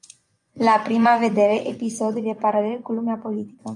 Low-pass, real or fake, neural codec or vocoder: 10.8 kHz; fake; vocoder, 24 kHz, 100 mel bands, Vocos